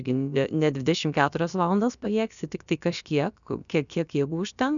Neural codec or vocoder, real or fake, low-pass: codec, 16 kHz, about 1 kbps, DyCAST, with the encoder's durations; fake; 7.2 kHz